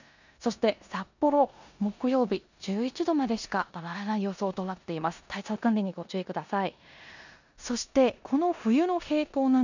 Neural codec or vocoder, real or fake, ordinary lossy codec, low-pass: codec, 16 kHz in and 24 kHz out, 0.9 kbps, LongCat-Audio-Codec, four codebook decoder; fake; none; 7.2 kHz